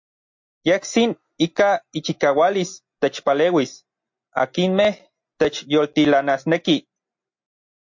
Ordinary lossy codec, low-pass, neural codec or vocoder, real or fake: MP3, 48 kbps; 7.2 kHz; none; real